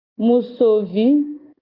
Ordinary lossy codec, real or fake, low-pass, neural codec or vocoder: Opus, 24 kbps; real; 5.4 kHz; none